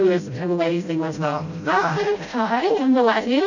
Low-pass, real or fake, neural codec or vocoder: 7.2 kHz; fake; codec, 16 kHz, 0.5 kbps, FreqCodec, smaller model